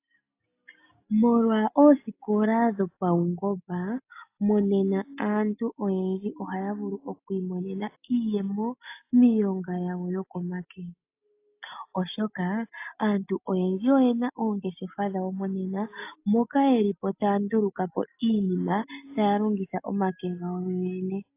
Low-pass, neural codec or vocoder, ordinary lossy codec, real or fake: 3.6 kHz; none; AAC, 24 kbps; real